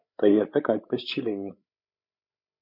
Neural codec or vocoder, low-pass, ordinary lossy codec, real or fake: codec, 16 kHz, 8 kbps, FreqCodec, larger model; 5.4 kHz; MP3, 48 kbps; fake